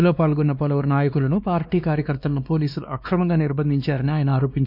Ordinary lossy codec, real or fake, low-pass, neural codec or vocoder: none; fake; 5.4 kHz; codec, 16 kHz, 2 kbps, X-Codec, WavLM features, trained on Multilingual LibriSpeech